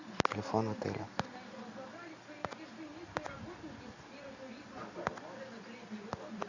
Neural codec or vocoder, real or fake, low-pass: none; real; 7.2 kHz